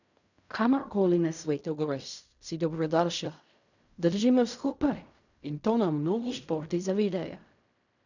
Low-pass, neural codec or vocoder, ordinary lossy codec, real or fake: 7.2 kHz; codec, 16 kHz in and 24 kHz out, 0.4 kbps, LongCat-Audio-Codec, fine tuned four codebook decoder; none; fake